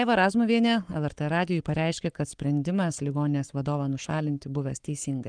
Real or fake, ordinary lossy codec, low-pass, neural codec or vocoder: fake; Opus, 24 kbps; 9.9 kHz; codec, 44.1 kHz, 7.8 kbps, Pupu-Codec